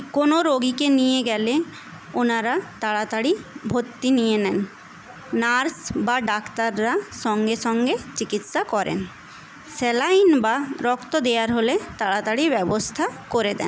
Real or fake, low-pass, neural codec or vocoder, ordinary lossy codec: real; none; none; none